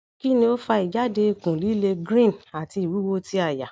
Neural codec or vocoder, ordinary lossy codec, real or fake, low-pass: none; none; real; none